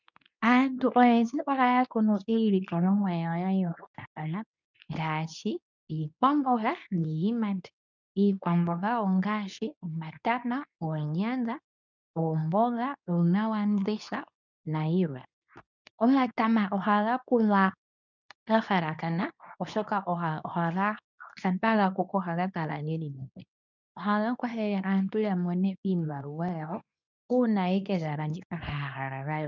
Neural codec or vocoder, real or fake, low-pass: codec, 24 kHz, 0.9 kbps, WavTokenizer, medium speech release version 2; fake; 7.2 kHz